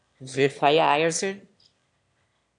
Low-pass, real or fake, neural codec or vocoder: 9.9 kHz; fake; autoencoder, 22.05 kHz, a latent of 192 numbers a frame, VITS, trained on one speaker